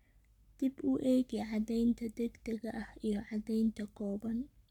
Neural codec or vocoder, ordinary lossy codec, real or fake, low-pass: codec, 44.1 kHz, 7.8 kbps, Pupu-Codec; none; fake; 19.8 kHz